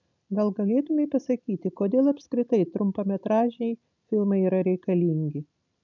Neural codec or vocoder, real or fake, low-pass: none; real; 7.2 kHz